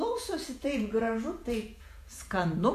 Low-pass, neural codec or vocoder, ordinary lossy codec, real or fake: 14.4 kHz; vocoder, 48 kHz, 128 mel bands, Vocos; MP3, 96 kbps; fake